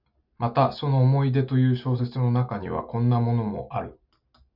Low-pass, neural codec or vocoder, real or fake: 5.4 kHz; none; real